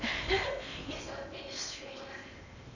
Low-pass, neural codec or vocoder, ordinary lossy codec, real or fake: 7.2 kHz; codec, 16 kHz in and 24 kHz out, 0.8 kbps, FocalCodec, streaming, 65536 codes; none; fake